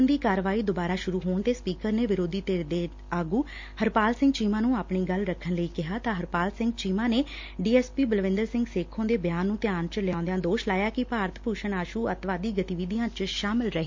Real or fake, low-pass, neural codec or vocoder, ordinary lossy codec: real; 7.2 kHz; none; MP3, 48 kbps